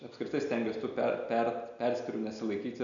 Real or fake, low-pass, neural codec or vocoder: real; 7.2 kHz; none